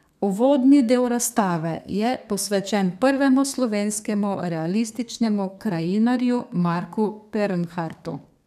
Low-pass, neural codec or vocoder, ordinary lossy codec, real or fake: 14.4 kHz; codec, 32 kHz, 1.9 kbps, SNAC; none; fake